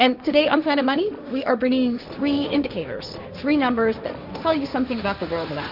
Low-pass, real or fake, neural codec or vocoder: 5.4 kHz; fake; codec, 16 kHz, 1.1 kbps, Voila-Tokenizer